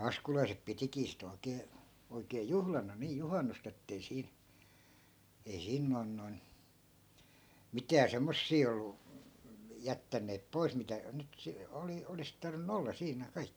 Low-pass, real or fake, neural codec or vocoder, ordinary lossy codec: none; real; none; none